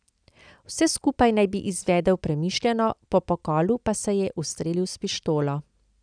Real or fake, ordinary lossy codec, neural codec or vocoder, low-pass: real; none; none; 9.9 kHz